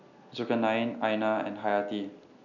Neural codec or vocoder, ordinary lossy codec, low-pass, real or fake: none; none; 7.2 kHz; real